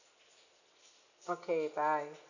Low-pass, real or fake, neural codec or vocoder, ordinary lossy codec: 7.2 kHz; real; none; none